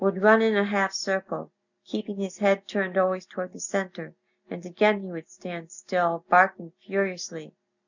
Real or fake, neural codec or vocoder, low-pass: real; none; 7.2 kHz